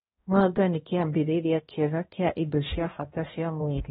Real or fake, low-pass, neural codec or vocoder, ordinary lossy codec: fake; 7.2 kHz; codec, 16 kHz, 1 kbps, X-Codec, HuBERT features, trained on balanced general audio; AAC, 16 kbps